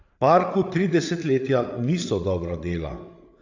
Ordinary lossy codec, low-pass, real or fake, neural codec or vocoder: AAC, 48 kbps; 7.2 kHz; fake; codec, 16 kHz, 8 kbps, FreqCodec, larger model